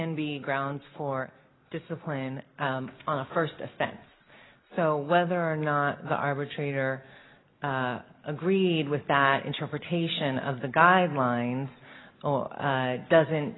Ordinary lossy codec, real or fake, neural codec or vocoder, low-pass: AAC, 16 kbps; real; none; 7.2 kHz